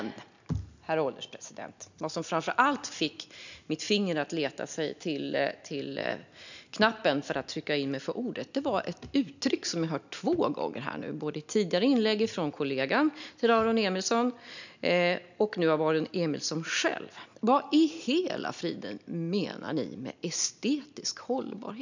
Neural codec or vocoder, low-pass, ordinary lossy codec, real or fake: none; 7.2 kHz; none; real